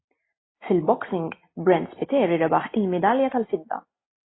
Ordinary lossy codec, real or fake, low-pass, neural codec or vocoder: AAC, 16 kbps; real; 7.2 kHz; none